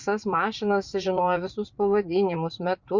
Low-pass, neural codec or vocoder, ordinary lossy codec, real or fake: 7.2 kHz; vocoder, 44.1 kHz, 80 mel bands, Vocos; Opus, 64 kbps; fake